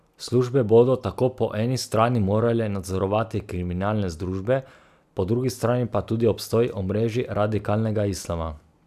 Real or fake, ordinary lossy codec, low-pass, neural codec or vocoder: real; none; 14.4 kHz; none